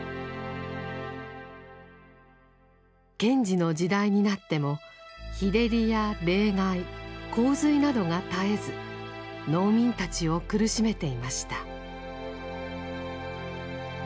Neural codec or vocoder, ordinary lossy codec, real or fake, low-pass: none; none; real; none